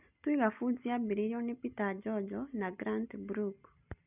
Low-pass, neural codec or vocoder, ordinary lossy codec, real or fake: 3.6 kHz; none; none; real